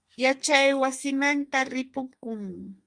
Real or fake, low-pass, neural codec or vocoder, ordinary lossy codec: fake; 9.9 kHz; codec, 32 kHz, 1.9 kbps, SNAC; Opus, 64 kbps